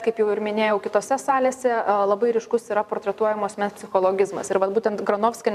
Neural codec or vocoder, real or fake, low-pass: vocoder, 44.1 kHz, 128 mel bands every 512 samples, BigVGAN v2; fake; 14.4 kHz